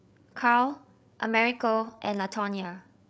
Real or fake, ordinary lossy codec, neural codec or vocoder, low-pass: fake; none; codec, 16 kHz, 8 kbps, FreqCodec, larger model; none